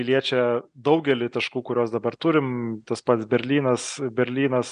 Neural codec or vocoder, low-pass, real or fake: none; 9.9 kHz; real